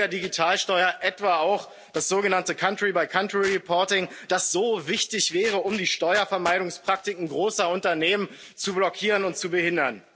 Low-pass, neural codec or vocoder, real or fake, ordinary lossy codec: none; none; real; none